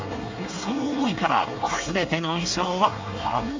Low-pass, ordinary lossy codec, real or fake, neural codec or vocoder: 7.2 kHz; MP3, 48 kbps; fake; codec, 24 kHz, 1 kbps, SNAC